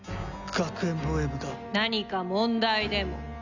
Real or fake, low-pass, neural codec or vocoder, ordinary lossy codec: real; 7.2 kHz; none; none